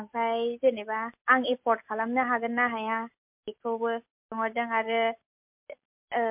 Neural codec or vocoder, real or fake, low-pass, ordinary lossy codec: none; real; 3.6 kHz; MP3, 32 kbps